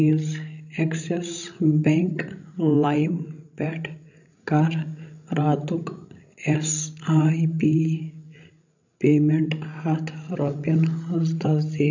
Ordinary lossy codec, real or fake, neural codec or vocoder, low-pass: none; fake; codec, 16 kHz, 16 kbps, FreqCodec, larger model; 7.2 kHz